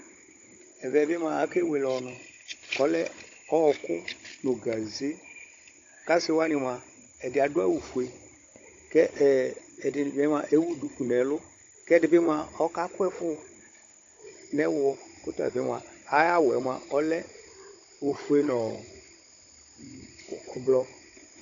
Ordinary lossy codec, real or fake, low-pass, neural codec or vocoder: AAC, 48 kbps; fake; 7.2 kHz; codec, 16 kHz, 16 kbps, FunCodec, trained on Chinese and English, 50 frames a second